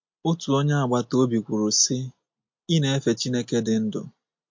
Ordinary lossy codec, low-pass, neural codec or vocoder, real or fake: MP3, 48 kbps; 7.2 kHz; none; real